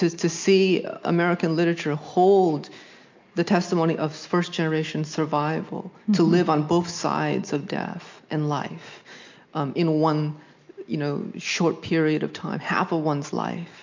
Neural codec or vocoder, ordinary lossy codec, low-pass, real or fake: none; MP3, 48 kbps; 7.2 kHz; real